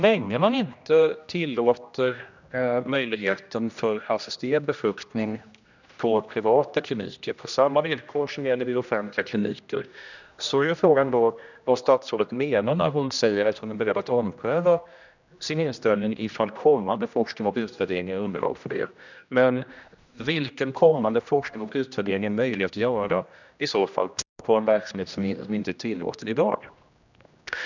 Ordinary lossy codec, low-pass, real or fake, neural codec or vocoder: none; 7.2 kHz; fake; codec, 16 kHz, 1 kbps, X-Codec, HuBERT features, trained on general audio